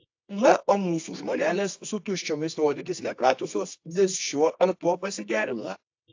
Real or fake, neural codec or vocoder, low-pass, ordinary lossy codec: fake; codec, 24 kHz, 0.9 kbps, WavTokenizer, medium music audio release; 7.2 kHz; AAC, 48 kbps